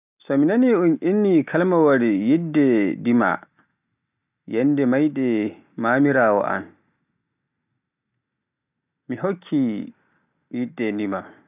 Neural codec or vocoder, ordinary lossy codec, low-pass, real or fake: none; none; 3.6 kHz; real